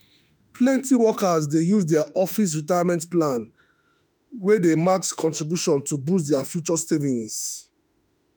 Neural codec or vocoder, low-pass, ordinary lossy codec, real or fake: autoencoder, 48 kHz, 32 numbers a frame, DAC-VAE, trained on Japanese speech; none; none; fake